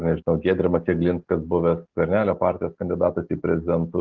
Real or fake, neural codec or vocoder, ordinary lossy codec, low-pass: real; none; Opus, 16 kbps; 7.2 kHz